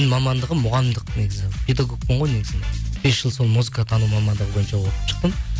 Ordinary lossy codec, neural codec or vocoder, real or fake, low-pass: none; none; real; none